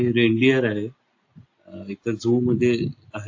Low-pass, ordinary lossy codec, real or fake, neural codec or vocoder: 7.2 kHz; AAC, 48 kbps; real; none